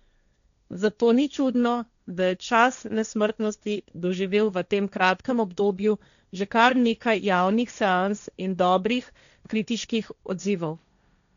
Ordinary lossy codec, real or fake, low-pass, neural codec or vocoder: none; fake; 7.2 kHz; codec, 16 kHz, 1.1 kbps, Voila-Tokenizer